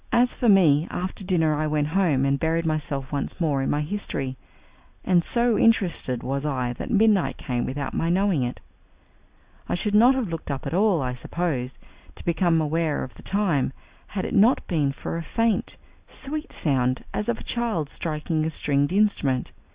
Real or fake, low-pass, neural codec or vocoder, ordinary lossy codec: real; 3.6 kHz; none; Opus, 64 kbps